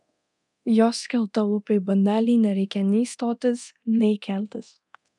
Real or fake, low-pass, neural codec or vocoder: fake; 10.8 kHz; codec, 24 kHz, 0.9 kbps, DualCodec